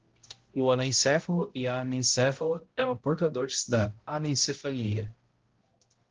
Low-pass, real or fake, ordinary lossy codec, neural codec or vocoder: 7.2 kHz; fake; Opus, 16 kbps; codec, 16 kHz, 0.5 kbps, X-Codec, HuBERT features, trained on balanced general audio